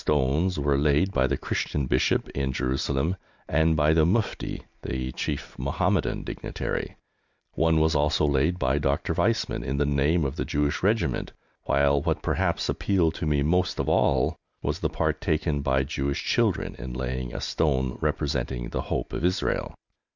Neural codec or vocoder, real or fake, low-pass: none; real; 7.2 kHz